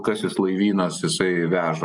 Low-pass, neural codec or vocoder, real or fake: 10.8 kHz; none; real